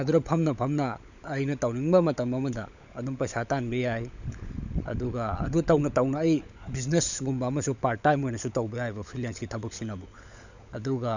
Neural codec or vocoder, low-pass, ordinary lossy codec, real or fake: codec, 16 kHz, 16 kbps, FunCodec, trained on LibriTTS, 50 frames a second; 7.2 kHz; none; fake